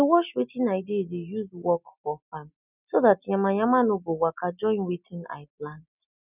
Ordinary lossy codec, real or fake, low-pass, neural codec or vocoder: none; real; 3.6 kHz; none